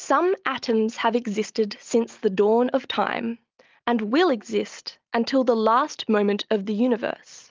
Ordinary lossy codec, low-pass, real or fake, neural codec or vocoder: Opus, 32 kbps; 7.2 kHz; real; none